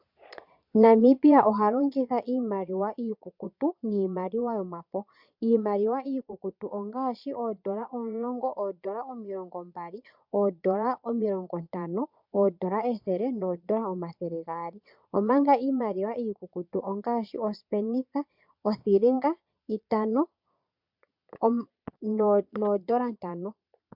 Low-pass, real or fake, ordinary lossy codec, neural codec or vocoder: 5.4 kHz; fake; MP3, 48 kbps; vocoder, 22.05 kHz, 80 mel bands, Vocos